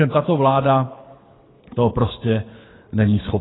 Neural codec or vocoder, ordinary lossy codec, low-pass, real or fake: codec, 24 kHz, 6 kbps, HILCodec; AAC, 16 kbps; 7.2 kHz; fake